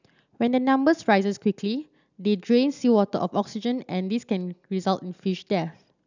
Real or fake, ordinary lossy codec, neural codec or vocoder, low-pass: real; none; none; 7.2 kHz